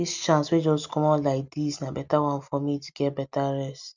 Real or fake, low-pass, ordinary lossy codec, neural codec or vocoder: real; 7.2 kHz; none; none